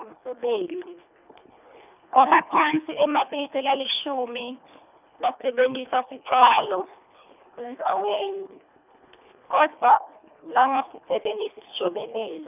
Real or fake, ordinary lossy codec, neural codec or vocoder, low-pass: fake; none; codec, 24 kHz, 1.5 kbps, HILCodec; 3.6 kHz